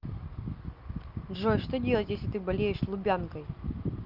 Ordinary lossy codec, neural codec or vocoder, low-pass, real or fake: Opus, 24 kbps; none; 5.4 kHz; real